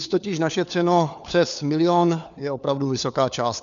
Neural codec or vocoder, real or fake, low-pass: codec, 16 kHz, 4 kbps, FunCodec, trained on LibriTTS, 50 frames a second; fake; 7.2 kHz